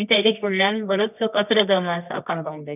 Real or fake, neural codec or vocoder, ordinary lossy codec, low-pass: fake; codec, 24 kHz, 0.9 kbps, WavTokenizer, medium music audio release; none; 3.6 kHz